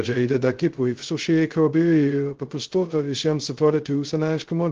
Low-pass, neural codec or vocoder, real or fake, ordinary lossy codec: 7.2 kHz; codec, 16 kHz, 0.2 kbps, FocalCodec; fake; Opus, 16 kbps